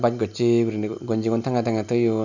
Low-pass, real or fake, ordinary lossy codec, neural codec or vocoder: 7.2 kHz; real; none; none